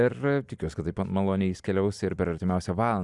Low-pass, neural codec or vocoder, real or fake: 10.8 kHz; none; real